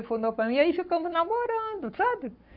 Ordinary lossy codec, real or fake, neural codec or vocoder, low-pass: none; fake; codec, 44.1 kHz, 7.8 kbps, Pupu-Codec; 5.4 kHz